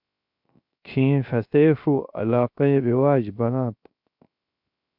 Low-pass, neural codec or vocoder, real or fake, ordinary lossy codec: 5.4 kHz; codec, 16 kHz, 0.3 kbps, FocalCodec; fake; MP3, 48 kbps